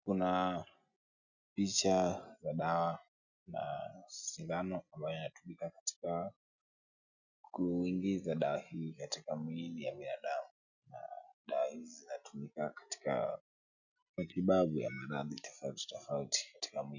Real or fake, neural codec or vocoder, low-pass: real; none; 7.2 kHz